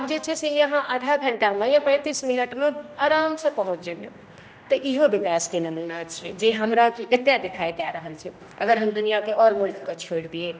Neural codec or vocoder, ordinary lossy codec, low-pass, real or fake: codec, 16 kHz, 1 kbps, X-Codec, HuBERT features, trained on general audio; none; none; fake